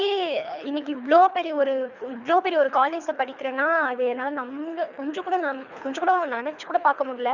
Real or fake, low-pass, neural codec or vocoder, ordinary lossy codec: fake; 7.2 kHz; codec, 24 kHz, 3 kbps, HILCodec; none